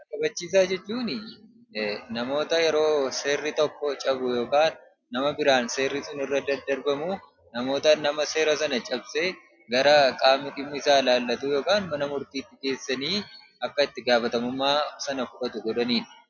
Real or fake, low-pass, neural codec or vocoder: real; 7.2 kHz; none